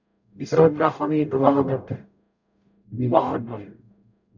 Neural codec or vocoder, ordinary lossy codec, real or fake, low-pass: codec, 44.1 kHz, 0.9 kbps, DAC; none; fake; 7.2 kHz